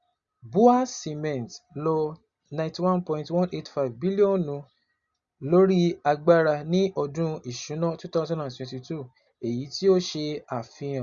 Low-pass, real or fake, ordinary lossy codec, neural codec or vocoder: 7.2 kHz; real; Opus, 64 kbps; none